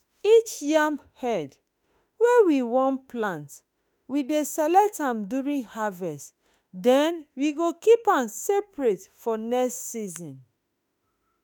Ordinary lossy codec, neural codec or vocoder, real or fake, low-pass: none; autoencoder, 48 kHz, 32 numbers a frame, DAC-VAE, trained on Japanese speech; fake; none